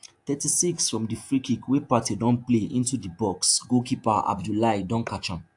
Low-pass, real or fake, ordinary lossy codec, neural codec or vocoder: 10.8 kHz; fake; AAC, 64 kbps; vocoder, 48 kHz, 128 mel bands, Vocos